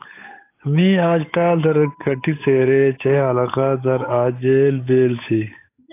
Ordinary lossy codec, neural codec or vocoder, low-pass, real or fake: AAC, 24 kbps; codec, 16 kHz, 8 kbps, FunCodec, trained on Chinese and English, 25 frames a second; 3.6 kHz; fake